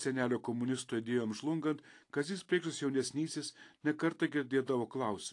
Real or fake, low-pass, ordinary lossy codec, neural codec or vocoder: real; 10.8 kHz; AAC, 48 kbps; none